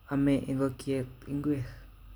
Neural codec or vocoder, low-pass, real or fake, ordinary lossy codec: none; none; real; none